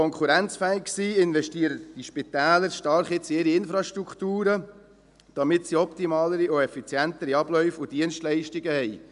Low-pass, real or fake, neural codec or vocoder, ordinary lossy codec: 10.8 kHz; real; none; none